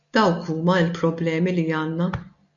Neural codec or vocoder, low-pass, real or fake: none; 7.2 kHz; real